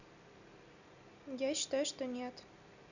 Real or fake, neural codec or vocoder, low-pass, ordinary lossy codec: real; none; 7.2 kHz; none